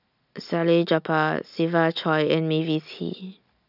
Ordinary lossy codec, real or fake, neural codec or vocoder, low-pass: none; real; none; 5.4 kHz